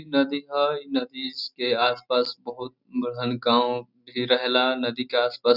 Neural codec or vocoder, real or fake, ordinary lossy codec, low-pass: none; real; none; 5.4 kHz